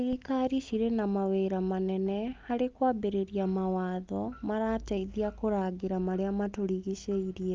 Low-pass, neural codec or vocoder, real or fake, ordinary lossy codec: 7.2 kHz; none; real; Opus, 32 kbps